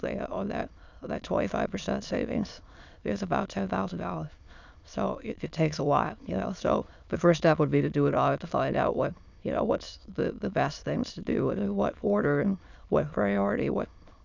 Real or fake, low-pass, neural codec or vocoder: fake; 7.2 kHz; autoencoder, 22.05 kHz, a latent of 192 numbers a frame, VITS, trained on many speakers